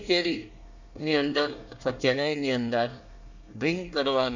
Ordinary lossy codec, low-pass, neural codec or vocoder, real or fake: none; 7.2 kHz; codec, 24 kHz, 1 kbps, SNAC; fake